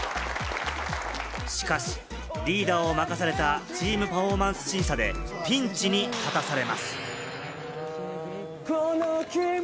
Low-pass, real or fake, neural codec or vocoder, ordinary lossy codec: none; real; none; none